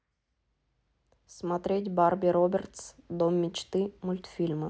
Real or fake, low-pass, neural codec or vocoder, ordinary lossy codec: real; none; none; none